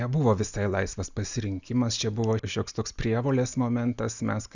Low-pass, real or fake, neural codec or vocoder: 7.2 kHz; real; none